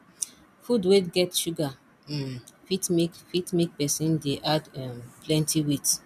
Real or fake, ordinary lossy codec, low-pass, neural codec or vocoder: real; none; 14.4 kHz; none